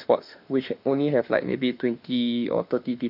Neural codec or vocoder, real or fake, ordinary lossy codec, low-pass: autoencoder, 48 kHz, 32 numbers a frame, DAC-VAE, trained on Japanese speech; fake; none; 5.4 kHz